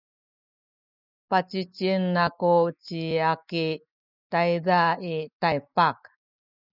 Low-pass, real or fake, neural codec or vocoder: 5.4 kHz; real; none